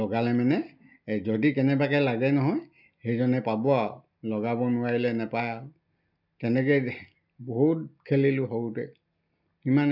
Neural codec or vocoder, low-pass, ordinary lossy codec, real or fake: none; 5.4 kHz; none; real